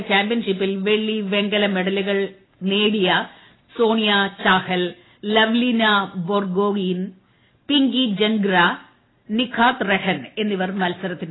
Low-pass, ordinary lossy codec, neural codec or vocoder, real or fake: 7.2 kHz; AAC, 16 kbps; none; real